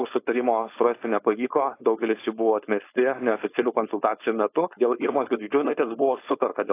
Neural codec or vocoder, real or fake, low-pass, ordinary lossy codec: codec, 16 kHz, 4.8 kbps, FACodec; fake; 3.6 kHz; AAC, 24 kbps